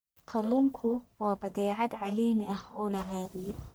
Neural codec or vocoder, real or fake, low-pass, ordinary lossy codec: codec, 44.1 kHz, 1.7 kbps, Pupu-Codec; fake; none; none